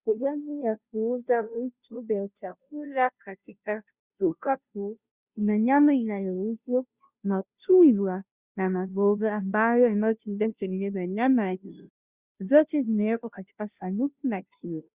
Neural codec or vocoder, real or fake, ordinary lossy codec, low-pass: codec, 16 kHz, 0.5 kbps, FunCodec, trained on Chinese and English, 25 frames a second; fake; Opus, 64 kbps; 3.6 kHz